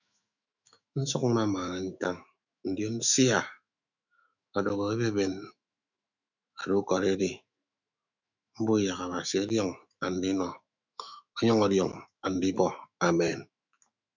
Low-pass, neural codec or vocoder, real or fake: 7.2 kHz; autoencoder, 48 kHz, 128 numbers a frame, DAC-VAE, trained on Japanese speech; fake